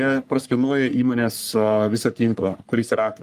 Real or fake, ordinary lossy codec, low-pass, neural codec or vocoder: fake; Opus, 32 kbps; 14.4 kHz; codec, 44.1 kHz, 3.4 kbps, Pupu-Codec